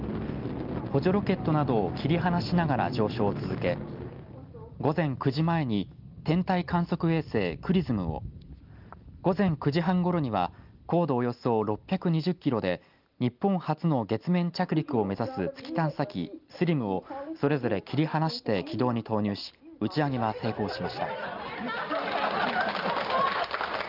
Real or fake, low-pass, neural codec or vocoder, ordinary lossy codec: real; 5.4 kHz; none; Opus, 32 kbps